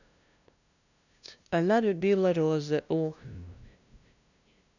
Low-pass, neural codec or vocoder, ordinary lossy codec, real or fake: 7.2 kHz; codec, 16 kHz, 0.5 kbps, FunCodec, trained on LibriTTS, 25 frames a second; none; fake